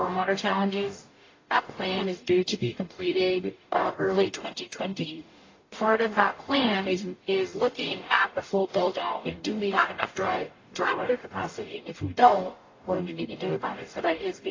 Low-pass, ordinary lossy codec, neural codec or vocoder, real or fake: 7.2 kHz; AAC, 32 kbps; codec, 44.1 kHz, 0.9 kbps, DAC; fake